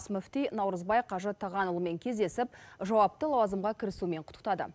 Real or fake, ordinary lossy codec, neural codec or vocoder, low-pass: real; none; none; none